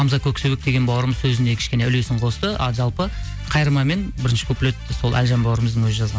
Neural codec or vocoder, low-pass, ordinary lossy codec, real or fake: none; none; none; real